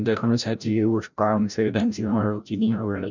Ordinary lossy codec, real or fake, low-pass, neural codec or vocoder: none; fake; 7.2 kHz; codec, 16 kHz, 0.5 kbps, FreqCodec, larger model